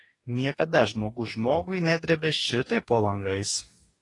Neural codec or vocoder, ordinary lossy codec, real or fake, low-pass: codec, 44.1 kHz, 2.6 kbps, DAC; AAC, 32 kbps; fake; 10.8 kHz